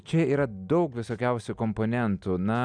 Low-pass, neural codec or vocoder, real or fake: 9.9 kHz; none; real